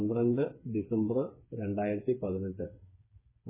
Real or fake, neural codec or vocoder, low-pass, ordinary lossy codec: fake; codec, 16 kHz, 4 kbps, FreqCodec, smaller model; 3.6 kHz; MP3, 16 kbps